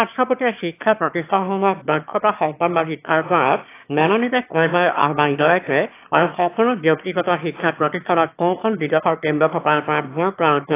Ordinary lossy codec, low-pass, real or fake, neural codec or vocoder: AAC, 24 kbps; 3.6 kHz; fake; autoencoder, 22.05 kHz, a latent of 192 numbers a frame, VITS, trained on one speaker